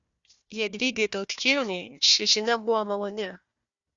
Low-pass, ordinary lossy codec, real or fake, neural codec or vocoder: 7.2 kHz; Opus, 64 kbps; fake; codec, 16 kHz, 1 kbps, FunCodec, trained on Chinese and English, 50 frames a second